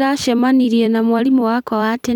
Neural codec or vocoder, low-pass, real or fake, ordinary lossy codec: vocoder, 44.1 kHz, 128 mel bands every 256 samples, BigVGAN v2; 19.8 kHz; fake; none